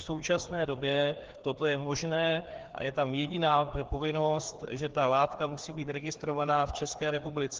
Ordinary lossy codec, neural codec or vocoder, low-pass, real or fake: Opus, 16 kbps; codec, 16 kHz, 2 kbps, FreqCodec, larger model; 7.2 kHz; fake